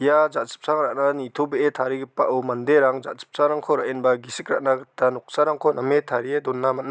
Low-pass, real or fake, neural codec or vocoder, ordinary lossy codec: none; real; none; none